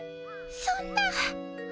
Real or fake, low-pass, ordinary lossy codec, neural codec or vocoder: real; none; none; none